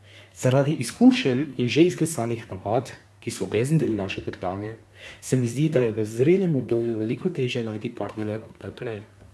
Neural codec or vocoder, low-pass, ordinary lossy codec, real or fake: codec, 24 kHz, 1 kbps, SNAC; none; none; fake